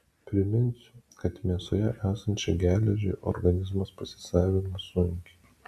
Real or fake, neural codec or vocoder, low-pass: real; none; 14.4 kHz